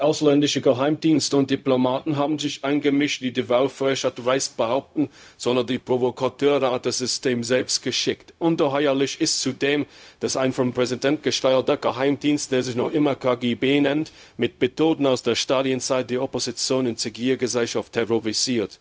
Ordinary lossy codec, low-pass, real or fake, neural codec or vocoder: none; none; fake; codec, 16 kHz, 0.4 kbps, LongCat-Audio-Codec